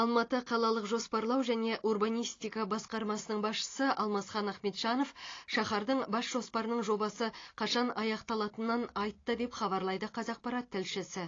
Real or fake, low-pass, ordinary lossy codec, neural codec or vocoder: real; 7.2 kHz; AAC, 32 kbps; none